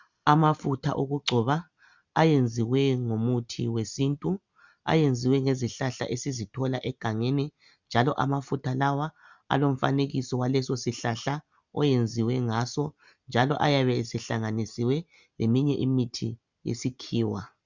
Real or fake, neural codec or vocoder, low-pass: real; none; 7.2 kHz